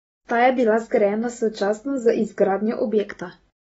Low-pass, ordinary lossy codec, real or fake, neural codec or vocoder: 10.8 kHz; AAC, 24 kbps; real; none